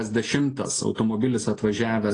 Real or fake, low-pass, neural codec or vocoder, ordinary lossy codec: real; 9.9 kHz; none; AAC, 32 kbps